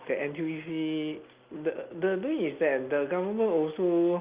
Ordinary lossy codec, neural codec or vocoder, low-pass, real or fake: Opus, 24 kbps; none; 3.6 kHz; real